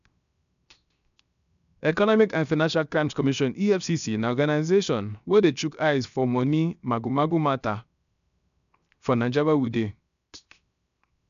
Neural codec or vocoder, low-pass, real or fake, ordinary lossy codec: codec, 16 kHz, 0.7 kbps, FocalCodec; 7.2 kHz; fake; none